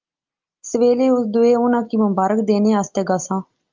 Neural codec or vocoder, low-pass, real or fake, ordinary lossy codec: none; 7.2 kHz; real; Opus, 24 kbps